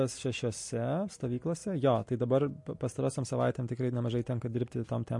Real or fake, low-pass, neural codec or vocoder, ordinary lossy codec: real; 10.8 kHz; none; MP3, 48 kbps